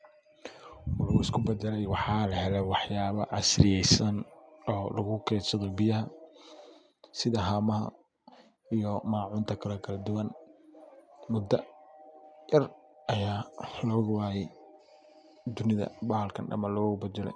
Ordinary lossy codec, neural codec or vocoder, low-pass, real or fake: none; none; 9.9 kHz; real